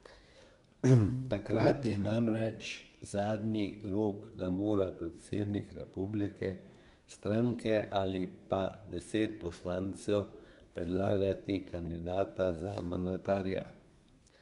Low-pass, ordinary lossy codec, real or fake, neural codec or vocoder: 10.8 kHz; MP3, 96 kbps; fake; codec, 24 kHz, 1 kbps, SNAC